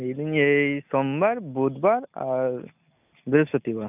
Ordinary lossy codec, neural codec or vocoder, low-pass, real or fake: none; none; 3.6 kHz; real